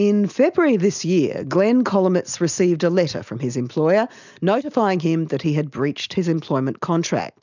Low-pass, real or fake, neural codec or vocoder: 7.2 kHz; real; none